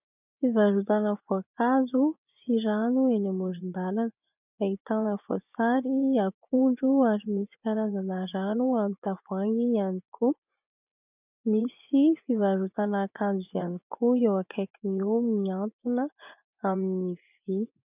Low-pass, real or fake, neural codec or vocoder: 3.6 kHz; fake; vocoder, 24 kHz, 100 mel bands, Vocos